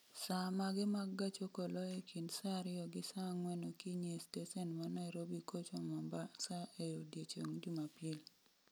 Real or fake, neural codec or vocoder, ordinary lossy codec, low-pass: real; none; none; none